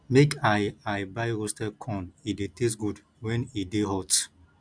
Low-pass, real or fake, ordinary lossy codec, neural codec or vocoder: 9.9 kHz; real; none; none